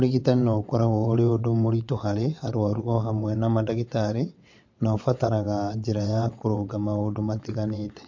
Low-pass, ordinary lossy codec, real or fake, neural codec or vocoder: 7.2 kHz; MP3, 48 kbps; fake; vocoder, 22.05 kHz, 80 mel bands, WaveNeXt